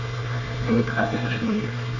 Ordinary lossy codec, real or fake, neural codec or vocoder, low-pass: none; fake; codec, 24 kHz, 1 kbps, SNAC; 7.2 kHz